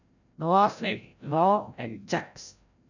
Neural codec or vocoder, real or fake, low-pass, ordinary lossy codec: codec, 16 kHz, 0.5 kbps, FreqCodec, larger model; fake; 7.2 kHz; none